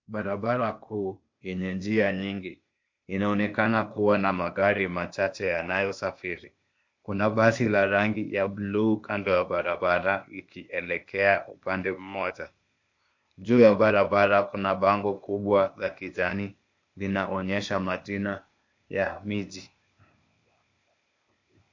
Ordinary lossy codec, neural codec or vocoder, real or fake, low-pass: MP3, 48 kbps; codec, 16 kHz, 0.8 kbps, ZipCodec; fake; 7.2 kHz